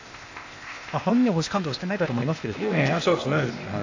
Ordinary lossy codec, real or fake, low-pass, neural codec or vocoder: AAC, 48 kbps; fake; 7.2 kHz; codec, 16 kHz, 0.8 kbps, ZipCodec